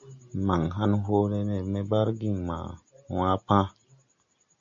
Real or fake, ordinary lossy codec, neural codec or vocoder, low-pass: real; MP3, 48 kbps; none; 7.2 kHz